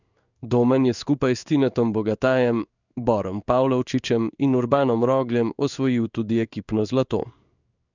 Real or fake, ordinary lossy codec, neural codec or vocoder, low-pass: fake; none; codec, 16 kHz in and 24 kHz out, 1 kbps, XY-Tokenizer; 7.2 kHz